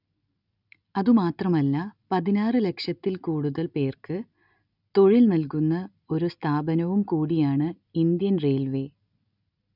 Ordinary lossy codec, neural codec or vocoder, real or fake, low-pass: none; none; real; 5.4 kHz